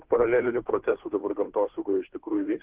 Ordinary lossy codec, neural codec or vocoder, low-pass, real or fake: Opus, 16 kbps; codec, 16 kHz, 2 kbps, FunCodec, trained on Chinese and English, 25 frames a second; 3.6 kHz; fake